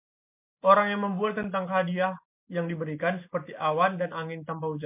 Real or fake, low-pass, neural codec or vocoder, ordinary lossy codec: real; 3.6 kHz; none; MP3, 32 kbps